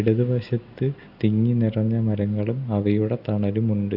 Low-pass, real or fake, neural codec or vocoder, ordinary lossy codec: 5.4 kHz; real; none; AAC, 48 kbps